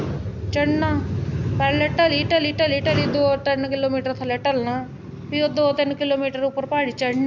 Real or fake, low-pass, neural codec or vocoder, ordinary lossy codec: real; 7.2 kHz; none; MP3, 64 kbps